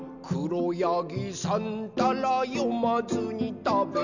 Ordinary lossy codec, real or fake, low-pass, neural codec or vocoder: none; real; 7.2 kHz; none